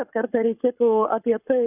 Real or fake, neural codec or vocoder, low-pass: fake; codec, 44.1 kHz, 7.8 kbps, Pupu-Codec; 3.6 kHz